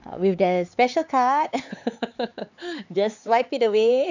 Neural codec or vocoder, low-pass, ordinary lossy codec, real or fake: codec, 16 kHz, 4 kbps, X-Codec, HuBERT features, trained on LibriSpeech; 7.2 kHz; none; fake